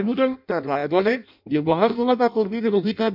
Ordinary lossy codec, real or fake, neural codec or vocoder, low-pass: MP3, 48 kbps; fake; codec, 16 kHz in and 24 kHz out, 0.6 kbps, FireRedTTS-2 codec; 5.4 kHz